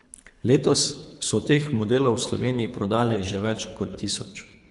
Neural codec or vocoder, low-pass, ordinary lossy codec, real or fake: codec, 24 kHz, 3 kbps, HILCodec; 10.8 kHz; none; fake